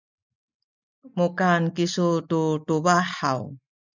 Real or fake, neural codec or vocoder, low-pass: real; none; 7.2 kHz